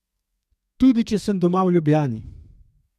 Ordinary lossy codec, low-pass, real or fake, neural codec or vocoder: MP3, 96 kbps; 14.4 kHz; fake; codec, 32 kHz, 1.9 kbps, SNAC